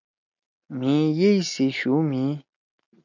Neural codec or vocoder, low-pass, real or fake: none; 7.2 kHz; real